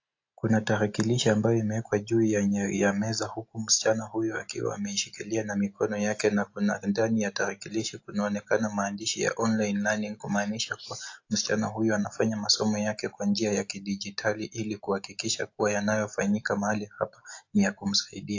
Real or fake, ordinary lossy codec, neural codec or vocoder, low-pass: real; AAC, 48 kbps; none; 7.2 kHz